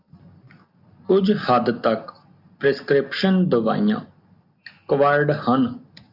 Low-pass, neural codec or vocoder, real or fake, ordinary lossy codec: 5.4 kHz; none; real; AAC, 48 kbps